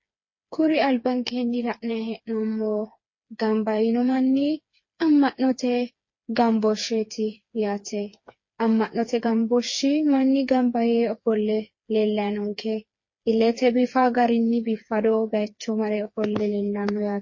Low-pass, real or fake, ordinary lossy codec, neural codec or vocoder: 7.2 kHz; fake; MP3, 32 kbps; codec, 16 kHz, 4 kbps, FreqCodec, smaller model